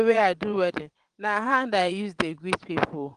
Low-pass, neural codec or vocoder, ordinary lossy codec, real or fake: 9.9 kHz; vocoder, 22.05 kHz, 80 mel bands, WaveNeXt; Opus, 24 kbps; fake